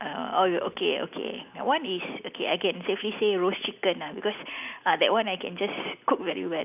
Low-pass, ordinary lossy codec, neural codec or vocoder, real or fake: 3.6 kHz; none; none; real